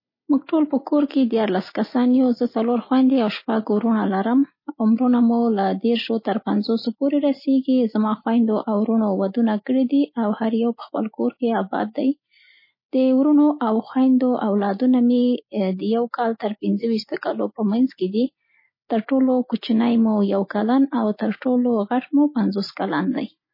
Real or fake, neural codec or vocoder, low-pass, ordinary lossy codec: real; none; 5.4 kHz; MP3, 24 kbps